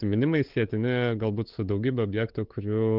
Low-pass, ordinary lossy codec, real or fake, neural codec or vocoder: 5.4 kHz; Opus, 16 kbps; fake; codec, 16 kHz, 8 kbps, FunCodec, trained on LibriTTS, 25 frames a second